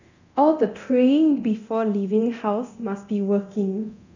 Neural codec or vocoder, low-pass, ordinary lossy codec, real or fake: codec, 24 kHz, 0.9 kbps, DualCodec; 7.2 kHz; none; fake